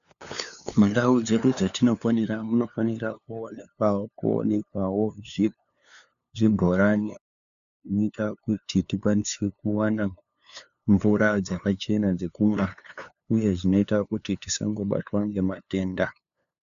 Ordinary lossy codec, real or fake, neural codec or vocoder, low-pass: MP3, 96 kbps; fake; codec, 16 kHz, 2 kbps, FunCodec, trained on LibriTTS, 25 frames a second; 7.2 kHz